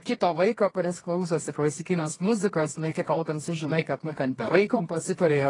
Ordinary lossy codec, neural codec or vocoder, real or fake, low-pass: AAC, 32 kbps; codec, 24 kHz, 0.9 kbps, WavTokenizer, medium music audio release; fake; 10.8 kHz